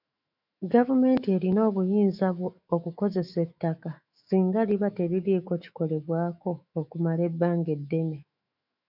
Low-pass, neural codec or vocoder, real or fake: 5.4 kHz; autoencoder, 48 kHz, 128 numbers a frame, DAC-VAE, trained on Japanese speech; fake